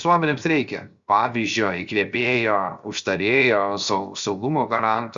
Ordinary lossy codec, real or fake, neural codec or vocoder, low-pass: Opus, 64 kbps; fake; codec, 16 kHz, 0.7 kbps, FocalCodec; 7.2 kHz